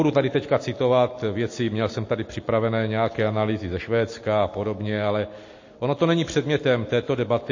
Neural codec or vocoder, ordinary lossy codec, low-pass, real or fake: none; MP3, 32 kbps; 7.2 kHz; real